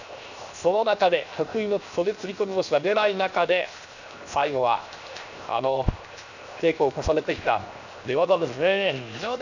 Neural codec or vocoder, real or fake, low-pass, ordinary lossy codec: codec, 16 kHz, 0.7 kbps, FocalCodec; fake; 7.2 kHz; none